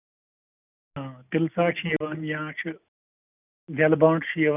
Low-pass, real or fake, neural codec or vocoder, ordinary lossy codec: 3.6 kHz; real; none; none